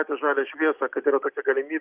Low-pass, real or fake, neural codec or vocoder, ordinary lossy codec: 3.6 kHz; real; none; Opus, 32 kbps